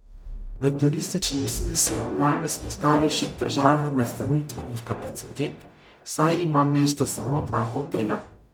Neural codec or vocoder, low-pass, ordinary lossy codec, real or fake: codec, 44.1 kHz, 0.9 kbps, DAC; none; none; fake